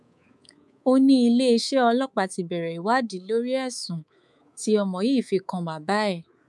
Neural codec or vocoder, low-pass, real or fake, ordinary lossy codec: codec, 24 kHz, 3.1 kbps, DualCodec; none; fake; none